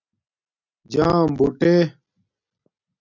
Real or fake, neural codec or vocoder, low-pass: real; none; 7.2 kHz